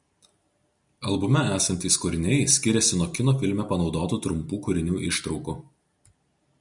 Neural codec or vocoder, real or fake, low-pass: none; real; 10.8 kHz